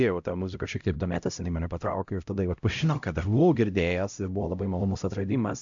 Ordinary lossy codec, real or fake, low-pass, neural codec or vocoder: AAC, 64 kbps; fake; 7.2 kHz; codec, 16 kHz, 0.5 kbps, X-Codec, HuBERT features, trained on LibriSpeech